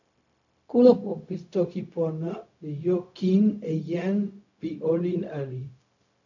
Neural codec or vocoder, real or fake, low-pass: codec, 16 kHz, 0.4 kbps, LongCat-Audio-Codec; fake; 7.2 kHz